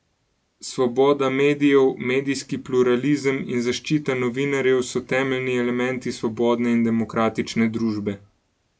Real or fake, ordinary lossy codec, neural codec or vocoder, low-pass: real; none; none; none